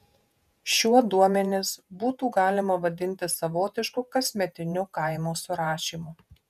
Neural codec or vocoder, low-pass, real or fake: vocoder, 48 kHz, 128 mel bands, Vocos; 14.4 kHz; fake